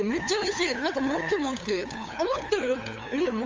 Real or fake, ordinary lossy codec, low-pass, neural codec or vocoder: fake; Opus, 32 kbps; 7.2 kHz; codec, 16 kHz, 8 kbps, FunCodec, trained on LibriTTS, 25 frames a second